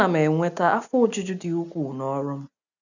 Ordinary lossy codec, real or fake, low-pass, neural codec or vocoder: none; real; 7.2 kHz; none